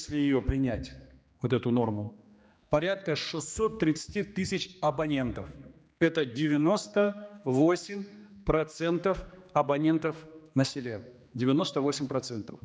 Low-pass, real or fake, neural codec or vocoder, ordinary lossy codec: none; fake; codec, 16 kHz, 2 kbps, X-Codec, HuBERT features, trained on general audio; none